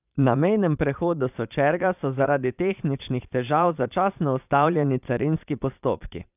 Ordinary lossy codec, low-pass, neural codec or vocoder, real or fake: none; 3.6 kHz; vocoder, 22.05 kHz, 80 mel bands, Vocos; fake